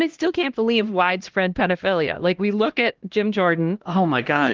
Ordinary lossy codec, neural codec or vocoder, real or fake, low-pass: Opus, 16 kbps; codec, 16 kHz, 1 kbps, X-Codec, HuBERT features, trained on LibriSpeech; fake; 7.2 kHz